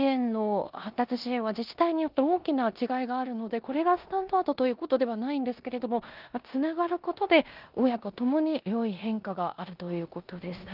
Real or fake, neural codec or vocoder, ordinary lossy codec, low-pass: fake; codec, 16 kHz in and 24 kHz out, 0.9 kbps, LongCat-Audio-Codec, four codebook decoder; Opus, 32 kbps; 5.4 kHz